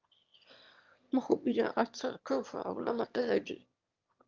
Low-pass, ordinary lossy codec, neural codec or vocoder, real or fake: 7.2 kHz; Opus, 24 kbps; autoencoder, 22.05 kHz, a latent of 192 numbers a frame, VITS, trained on one speaker; fake